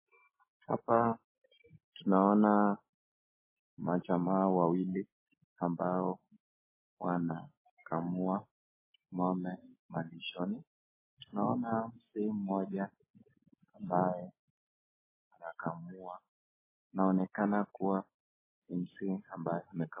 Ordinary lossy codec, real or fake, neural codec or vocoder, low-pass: MP3, 16 kbps; real; none; 3.6 kHz